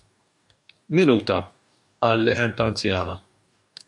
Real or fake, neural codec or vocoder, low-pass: fake; codec, 44.1 kHz, 2.6 kbps, DAC; 10.8 kHz